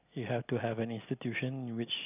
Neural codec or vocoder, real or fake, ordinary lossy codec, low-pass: none; real; none; 3.6 kHz